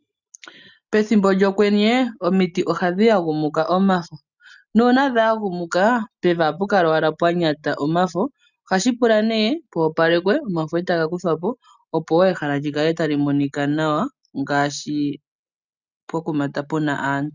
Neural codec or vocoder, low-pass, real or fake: none; 7.2 kHz; real